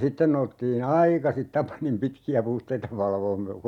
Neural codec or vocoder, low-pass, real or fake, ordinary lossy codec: none; 19.8 kHz; real; none